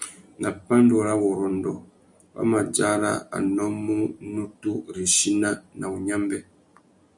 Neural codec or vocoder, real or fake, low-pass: none; real; 10.8 kHz